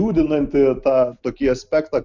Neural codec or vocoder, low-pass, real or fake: none; 7.2 kHz; real